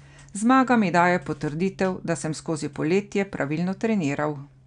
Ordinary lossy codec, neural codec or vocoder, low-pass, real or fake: none; none; 9.9 kHz; real